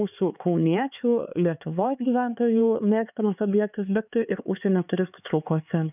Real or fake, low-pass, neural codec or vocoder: fake; 3.6 kHz; codec, 16 kHz, 4 kbps, X-Codec, HuBERT features, trained on LibriSpeech